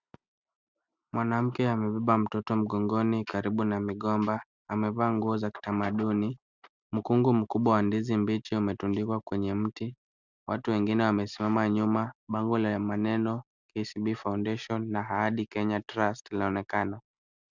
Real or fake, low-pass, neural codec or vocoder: real; 7.2 kHz; none